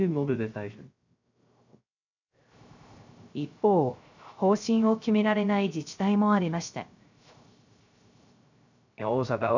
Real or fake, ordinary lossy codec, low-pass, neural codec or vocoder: fake; none; 7.2 kHz; codec, 16 kHz, 0.3 kbps, FocalCodec